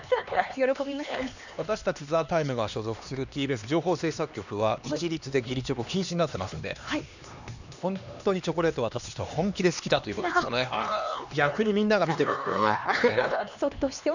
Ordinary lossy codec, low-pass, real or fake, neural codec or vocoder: none; 7.2 kHz; fake; codec, 16 kHz, 2 kbps, X-Codec, HuBERT features, trained on LibriSpeech